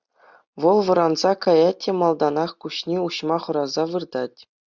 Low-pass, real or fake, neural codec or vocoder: 7.2 kHz; real; none